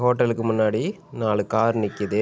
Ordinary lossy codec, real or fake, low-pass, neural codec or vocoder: none; real; none; none